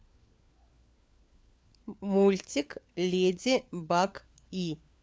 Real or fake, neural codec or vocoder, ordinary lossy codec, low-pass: fake; codec, 16 kHz, 4 kbps, FunCodec, trained on LibriTTS, 50 frames a second; none; none